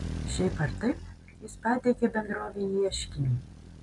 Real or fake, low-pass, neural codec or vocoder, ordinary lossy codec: real; 10.8 kHz; none; MP3, 96 kbps